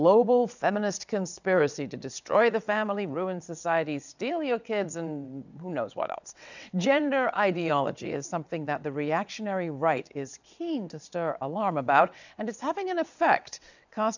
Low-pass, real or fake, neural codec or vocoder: 7.2 kHz; fake; vocoder, 22.05 kHz, 80 mel bands, WaveNeXt